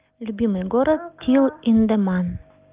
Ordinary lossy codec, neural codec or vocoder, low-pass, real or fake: Opus, 32 kbps; none; 3.6 kHz; real